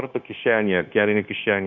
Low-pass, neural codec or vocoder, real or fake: 7.2 kHz; codec, 16 kHz, 0.9 kbps, LongCat-Audio-Codec; fake